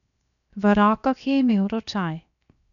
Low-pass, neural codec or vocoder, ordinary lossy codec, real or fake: 7.2 kHz; codec, 16 kHz, 0.7 kbps, FocalCodec; none; fake